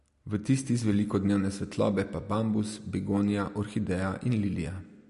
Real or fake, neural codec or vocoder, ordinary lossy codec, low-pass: real; none; MP3, 48 kbps; 14.4 kHz